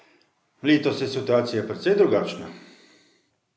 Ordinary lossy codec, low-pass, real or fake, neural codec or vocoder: none; none; real; none